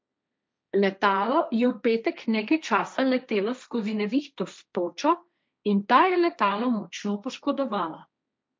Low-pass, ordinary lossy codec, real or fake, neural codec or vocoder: none; none; fake; codec, 16 kHz, 1.1 kbps, Voila-Tokenizer